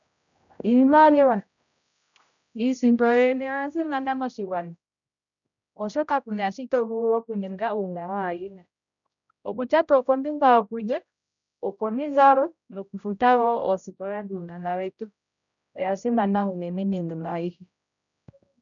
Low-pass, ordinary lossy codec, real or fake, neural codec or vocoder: 7.2 kHz; MP3, 96 kbps; fake; codec, 16 kHz, 0.5 kbps, X-Codec, HuBERT features, trained on general audio